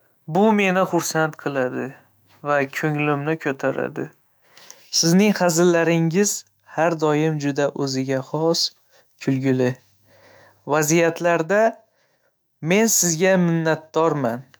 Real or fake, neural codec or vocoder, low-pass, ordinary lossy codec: fake; autoencoder, 48 kHz, 128 numbers a frame, DAC-VAE, trained on Japanese speech; none; none